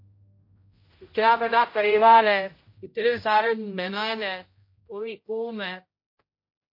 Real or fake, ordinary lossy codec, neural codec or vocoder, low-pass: fake; MP3, 32 kbps; codec, 16 kHz, 0.5 kbps, X-Codec, HuBERT features, trained on general audio; 5.4 kHz